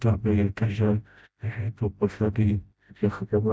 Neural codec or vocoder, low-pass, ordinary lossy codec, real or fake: codec, 16 kHz, 0.5 kbps, FreqCodec, smaller model; none; none; fake